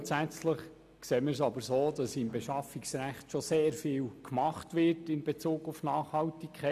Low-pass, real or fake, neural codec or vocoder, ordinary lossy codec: 14.4 kHz; real; none; none